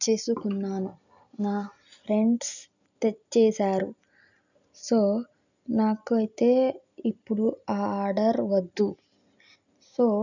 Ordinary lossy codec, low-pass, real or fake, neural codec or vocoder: none; 7.2 kHz; real; none